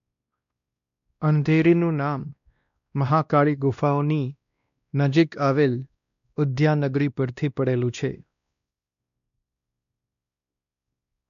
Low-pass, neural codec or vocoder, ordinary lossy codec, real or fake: 7.2 kHz; codec, 16 kHz, 1 kbps, X-Codec, WavLM features, trained on Multilingual LibriSpeech; none; fake